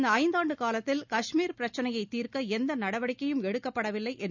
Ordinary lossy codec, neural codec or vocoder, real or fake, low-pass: none; none; real; 7.2 kHz